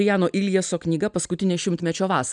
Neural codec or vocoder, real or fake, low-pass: none; real; 9.9 kHz